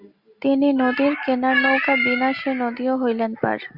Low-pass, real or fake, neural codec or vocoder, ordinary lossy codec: 5.4 kHz; real; none; Opus, 64 kbps